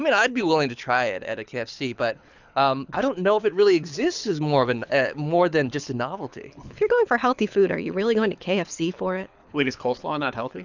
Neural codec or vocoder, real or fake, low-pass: codec, 24 kHz, 6 kbps, HILCodec; fake; 7.2 kHz